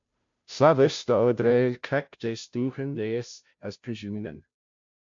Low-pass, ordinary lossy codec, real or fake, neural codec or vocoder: 7.2 kHz; MP3, 48 kbps; fake; codec, 16 kHz, 0.5 kbps, FunCodec, trained on Chinese and English, 25 frames a second